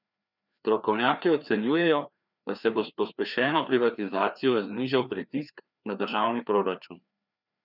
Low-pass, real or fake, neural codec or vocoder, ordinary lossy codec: 5.4 kHz; fake; codec, 16 kHz, 2 kbps, FreqCodec, larger model; none